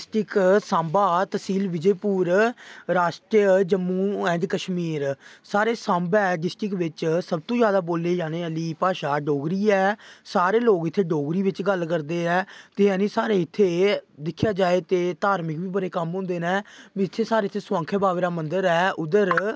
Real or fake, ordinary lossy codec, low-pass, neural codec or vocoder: real; none; none; none